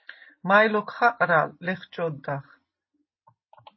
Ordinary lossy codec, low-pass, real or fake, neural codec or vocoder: MP3, 24 kbps; 7.2 kHz; real; none